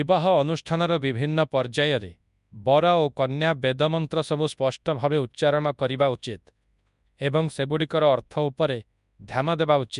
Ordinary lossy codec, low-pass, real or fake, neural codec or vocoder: none; 10.8 kHz; fake; codec, 24 kHz, 0.9 kbps, WavTokenizer, large speech release